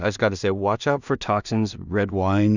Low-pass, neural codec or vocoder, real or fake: 7.2 kHz; codec, 16 kHz in and 24 kHz out, 0.4 kbps, LongCat-Audio-Codec, two codebook decoder; fake